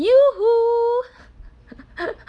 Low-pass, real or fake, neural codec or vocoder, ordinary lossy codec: 9.9 kHz; real; none; none